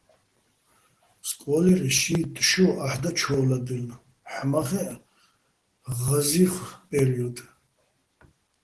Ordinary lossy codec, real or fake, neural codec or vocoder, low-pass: Opus, 16 kbps; real; none; 10.8 kHz